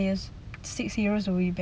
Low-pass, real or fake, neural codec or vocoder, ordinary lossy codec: none; real; none; none